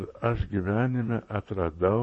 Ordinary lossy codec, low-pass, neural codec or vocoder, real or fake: MP3, 32 kbps; 9.9 kHz; vocoder, 44.1 kHz, 128 mel bands, Pupu-Vocoder; fake